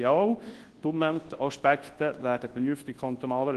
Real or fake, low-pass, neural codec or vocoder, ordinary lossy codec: fake; 10.8 kHz; codec, 24 kHz, 0.9 kbps, WavTokenizer, large speech release; Opus, 16 kbps